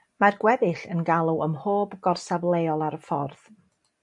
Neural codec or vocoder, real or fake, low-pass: none; real; 10.8 kHz